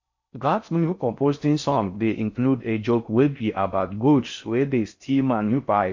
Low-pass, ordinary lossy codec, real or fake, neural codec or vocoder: 7.2 kHz; MP3, 48 kbps; fake; codec, 16 kHz in and 24 kHz out, 0.6 kbps, FocalCodec, streaming, 4096 codes